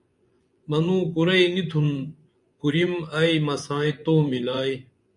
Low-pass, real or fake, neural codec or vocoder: 10.8 kHz; fake; vocoder, 24 kHz, 100 mel bands, Vocos